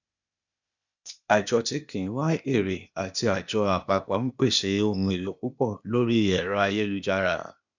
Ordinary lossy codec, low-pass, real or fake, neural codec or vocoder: none; 7.2 kHz; fake; codec, 16 kHz, 0.8 kbps, ZipCodec